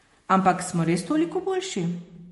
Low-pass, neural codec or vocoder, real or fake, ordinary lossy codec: 14.4 kHz; vocoder, 48 kHz, 128 mel bands, Vocos; fake; MP3, 48 kbps